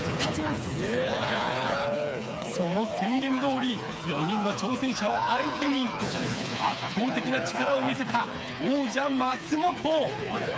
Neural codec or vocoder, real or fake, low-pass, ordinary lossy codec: codec, 16 kHz, 4 kbps, FreqCodec, smaller model; fake; none; none